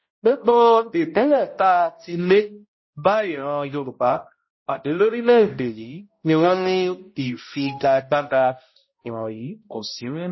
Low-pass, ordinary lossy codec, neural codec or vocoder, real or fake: 7.2 kHz; MP3, 24 kbps; codec, 16 kHz, 0.5 kbps, X-Codec, HuBERT features, trained on balanced general audio; fake